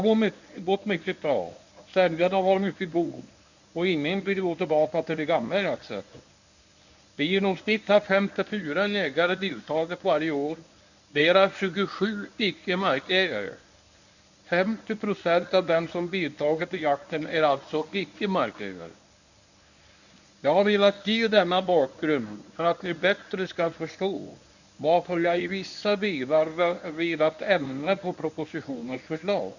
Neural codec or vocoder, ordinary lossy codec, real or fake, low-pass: codec, 24 kHz, 0.9 kbps, WavTokenizer, medium speech release version 1; none; fake; 7.2 kHz